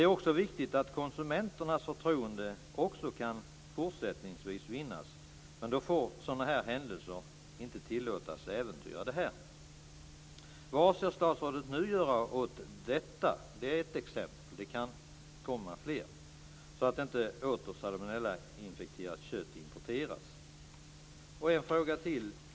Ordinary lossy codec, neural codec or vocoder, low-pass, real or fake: none; none; none; real